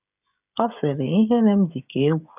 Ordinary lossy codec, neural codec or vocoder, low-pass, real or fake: none; codec, 16 kHz, 16 kbps, FreqCodec, smaller model; 3.6 kHz; fake